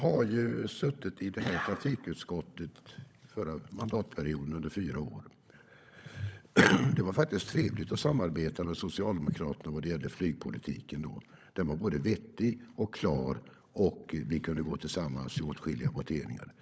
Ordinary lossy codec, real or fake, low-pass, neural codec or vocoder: none; fake; none; codec, 16 kHz, 16 kbps, FunCodec, trained on LibriTTS, 50 frames a second